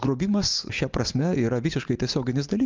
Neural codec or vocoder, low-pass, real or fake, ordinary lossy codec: none; 7.2 kHz; real; Opus, 24 kbps